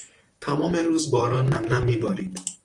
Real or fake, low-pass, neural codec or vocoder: fake; 10.8 kHz; vocoder, 44.1 kHz, 128 mel bands, Pupu-Vocoder